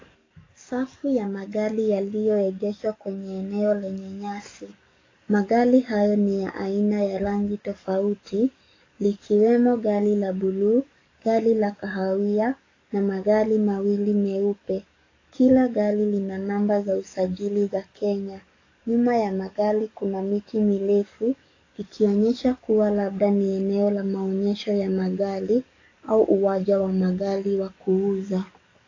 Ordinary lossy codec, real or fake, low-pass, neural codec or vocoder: AAC, 32 kbps; fake; 7.2 kHz; codec, 44.1 kHz, 7.8 kbps, DAC